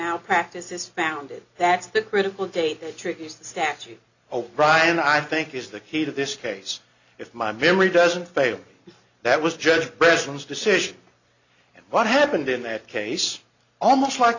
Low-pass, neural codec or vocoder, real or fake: 7.2 kHz; none; real